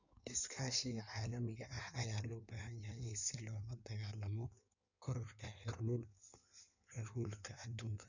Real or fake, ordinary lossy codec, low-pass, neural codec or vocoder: fake; none; 7.2 kHz; codec, 16 kHz in and 24 kHz out, 1.1 kbps, FireRedTTS-2 codec